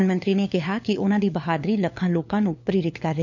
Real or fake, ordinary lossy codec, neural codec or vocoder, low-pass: fake; none; codec, 16 kHz, 4 kbps, FunCodec, trained on LibriTTS, 50 frames a second; 7.2 kHz